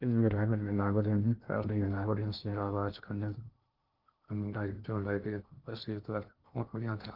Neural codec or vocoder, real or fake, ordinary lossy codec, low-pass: codec, 16 kHz in and 24 kHz out, 0.6 kbps, FocalCodec, streaming, 2048 codes; fake; Opus, 24 kbps; 5.4 kHz